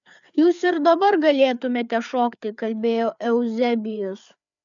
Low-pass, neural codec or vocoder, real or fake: 7.2 kHz; codec, 16 kHz, 4 kbps, FreqCodec, larger model; fake